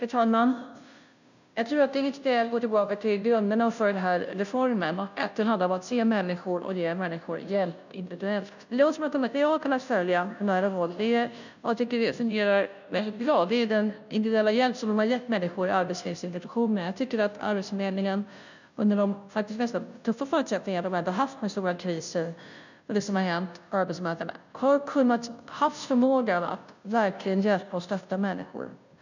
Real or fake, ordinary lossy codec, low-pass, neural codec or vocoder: fake; none; 7.2 kHz; codec, 16 kHz, 0.5 kbps, FunCodec, trained on Chinese and English, 25 frames a second